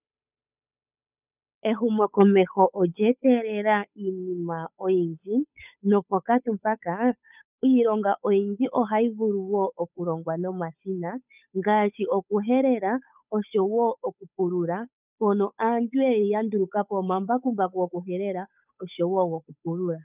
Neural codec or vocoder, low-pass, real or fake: codec, 16 kHz, 8 kbps, FunCodec, trained on Chinese and English, 25 frames a second; 3.6 kHz; fake